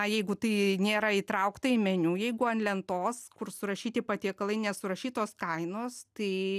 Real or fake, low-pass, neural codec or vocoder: real; 14.4 kHz; none